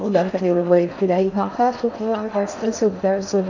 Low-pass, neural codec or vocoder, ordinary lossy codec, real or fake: 7.2 kHz; codec, 16 kHz in and 24 kHz out, 0.6 kbps, FocalCodec, streaming, 4096 codes; none; fake